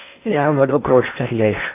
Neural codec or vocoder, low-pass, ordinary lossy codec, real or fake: codec, 16 kHz in and 24 kHz out, 0.8 kbps, FocalCodec, streaming, 65536 codes; 3.6 kHz; AAC, 24 kbps; fake